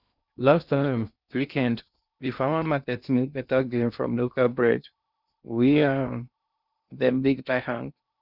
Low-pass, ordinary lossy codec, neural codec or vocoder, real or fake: 5.4 kHz; Opus, 64 kbps; codec, 16 kHz in and 24 kHz out, 0.6 kbps, FocalCodec, streaming, 2048 codes; fake